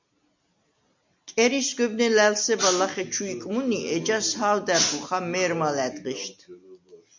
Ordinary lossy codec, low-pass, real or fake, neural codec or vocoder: MP3, 64 kbps; 7.2 kHz; real; none